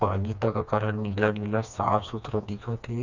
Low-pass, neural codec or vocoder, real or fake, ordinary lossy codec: 7.2 kHz; codec, 16 kHz, 2 kbps, FreqCodec, smaller model; fake; none